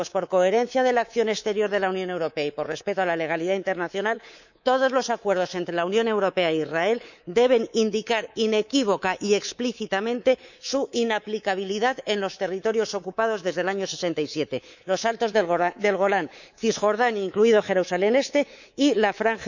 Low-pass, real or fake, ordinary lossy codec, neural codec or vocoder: 7.2 kHz; fake; none; codec, 24 kHz, 3.1 kbps, DualCodec